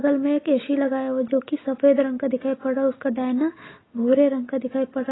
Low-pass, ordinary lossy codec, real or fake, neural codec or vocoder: 7.2 kHz; AAC, 16 kbps; real; none